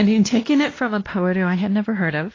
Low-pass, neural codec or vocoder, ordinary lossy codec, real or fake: 7.2 kHz; codec, 16 kHz, 1 kbps, X-Codec, WavLM features, trained on Multilingual LibriSpeech; AAC, 32 kbps; fake